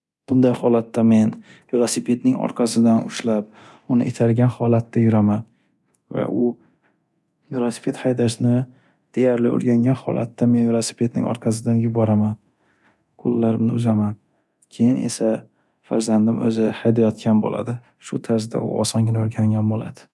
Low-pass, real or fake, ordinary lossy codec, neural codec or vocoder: none; fake; none; codec, 24 kHz, 0.9 kbps, DualCodec